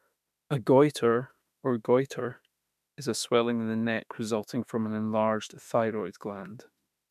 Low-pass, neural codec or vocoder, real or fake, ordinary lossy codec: 14.4 kHz; autoencoder, 48 kHz, 32 numbers a frame, DAC-VAE, trained on Japanese speech; fake; none